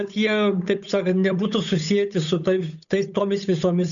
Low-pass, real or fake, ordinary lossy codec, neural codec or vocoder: 7.2 kHz; fake; MP3, 96 kbps; codec, 16 kHz, 8 kbps, FunCodec, trained on Chinese and English, 25 frames a second